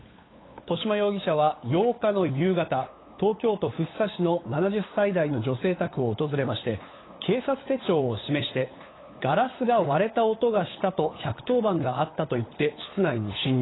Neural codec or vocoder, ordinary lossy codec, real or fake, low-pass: codec, 16 kHz, 8 kbps, FunCodec, trained on LibriTTS, 25 frames a second; AAC, 16 kbps; fake; 7.2 kHz